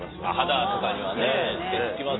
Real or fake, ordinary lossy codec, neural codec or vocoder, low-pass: real; AAC, 16 kbps; none; 7.2 kHz